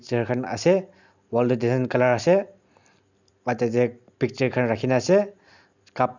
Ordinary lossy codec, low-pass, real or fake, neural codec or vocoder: none; 7.2 kHz; real; none